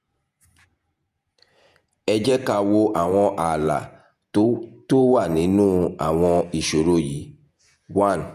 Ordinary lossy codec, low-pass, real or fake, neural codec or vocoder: none; 14.4 kHz; fake; vocoder, 48 kHz, 128 mel bands, Vocos